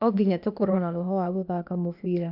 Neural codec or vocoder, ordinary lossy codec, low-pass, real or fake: codec, 16 kHz, 0.8 kbps, ZipCodec; none; 5.4 kHz; fake